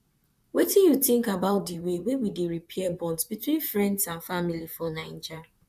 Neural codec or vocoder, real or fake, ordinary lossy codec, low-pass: vocoder, 44.1 kHz, 128 mel bands, Pupu-Vocoder; fake; none; 14.4 kHz